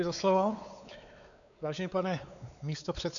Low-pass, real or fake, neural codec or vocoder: 7.2 kHz; fake; codec, 16 kHz, 4 kbps, X-Codec, WavLM features, trained on Multilingual LibriSpeech